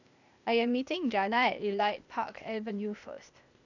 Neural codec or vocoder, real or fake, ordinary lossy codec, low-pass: codec, 16 kHz, 0.8 kbps, ZipCodec; fake; none; 7.2 kHz